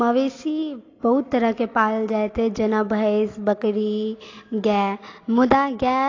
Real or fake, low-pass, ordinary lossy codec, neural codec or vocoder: real; 7.2 kHz; AAC, 32 kbps; none